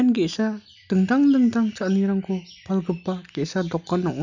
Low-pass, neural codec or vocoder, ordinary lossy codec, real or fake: 7.2 kHz; none; none; real